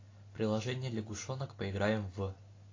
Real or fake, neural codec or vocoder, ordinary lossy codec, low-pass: real; none; AAC, 32 kbps; 7.2 kHz